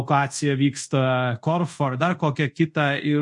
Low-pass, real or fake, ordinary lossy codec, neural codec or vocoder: 10.8 kHz; fake; MP3, 48 kbps; codec, 24 kHz, 0.5 kbps, DualCodec